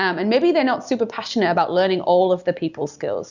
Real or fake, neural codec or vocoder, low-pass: real; none; 7.2 kHz